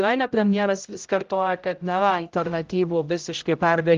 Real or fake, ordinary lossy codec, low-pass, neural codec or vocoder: fake; Opus, 32 kbps; 7.2 kHz; codec, 16 kHz, 0.5 kbps, X-Codec, HuBERT features, trained on general audio